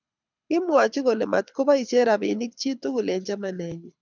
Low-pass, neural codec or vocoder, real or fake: 7.2 kHz; codec, 24 kHz, 6 kbps, HILCodec; fake